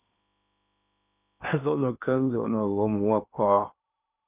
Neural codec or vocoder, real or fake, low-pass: codec, 16 kHz in and 24 kHz out, 0.8 kbps, FocalCodec, streaming, 65536 codes; fake; 3.6 kHz